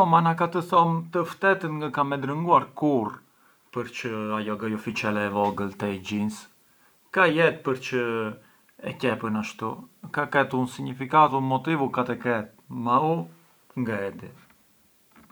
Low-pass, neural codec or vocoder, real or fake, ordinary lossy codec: none; none; real; none